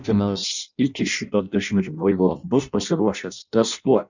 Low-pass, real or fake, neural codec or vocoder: 7.2 kHz; fake; codec, 16 kHz in and 24 kHz out, 0.6 kbps, FireRedTTS-2 codec